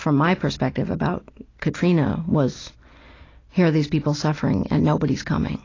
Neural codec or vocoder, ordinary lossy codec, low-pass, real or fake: none; AAC, 32 kbps; 7.2 kHz; real